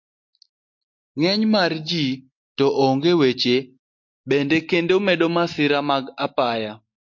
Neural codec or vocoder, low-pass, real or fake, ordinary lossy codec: none; 7.2 kHz; real; MP3, 48 kbps